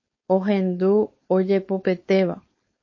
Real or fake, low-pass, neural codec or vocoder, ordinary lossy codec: fake; 7.2 kHz; codec, 16 kHz, 4.8 kbps, FACodec; MP3, 32 kbps